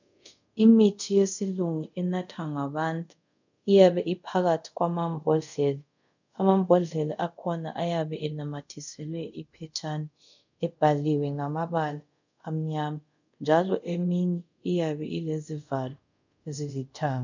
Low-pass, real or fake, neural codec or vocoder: 7.2 kHz; fake; codec, 24 kHz, 0.5 kbps, DualCodec